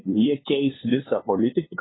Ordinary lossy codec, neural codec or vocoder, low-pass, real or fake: AAC, 16 kbps; codec, 16 kHz, 2 kbps, FunCodec, trained on LibriTTS, 25 frames a second; 7.2 kHz; fake